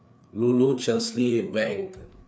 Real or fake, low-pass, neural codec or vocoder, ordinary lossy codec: fake; none; codec, 16 kHz, 4 kbps, FreqCodec, larger model; none